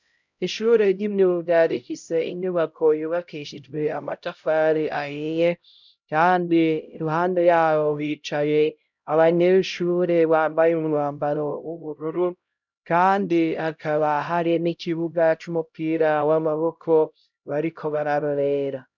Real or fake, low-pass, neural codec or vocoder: fake; 7.2 kHz; codec, 16 kHz, 0.5 kbps, X-Codec, HuBERT features, trained on LibriSpeech